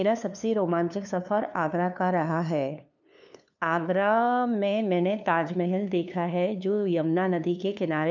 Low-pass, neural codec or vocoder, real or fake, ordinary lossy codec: 7.2 kHz; codec, 16 kHz, 2 kbps, FunCodec, trained on LibriTTS, 25 frames a second; fake; none